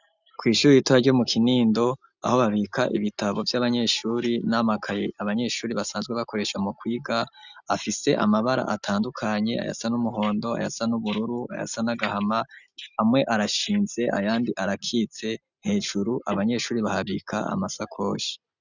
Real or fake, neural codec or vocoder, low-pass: real; none; 7.2 kHz